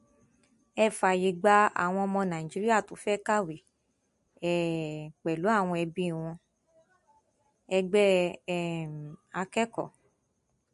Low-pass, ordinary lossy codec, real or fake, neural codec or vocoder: 14.4 kHz; MP3, 48 kbps; real; none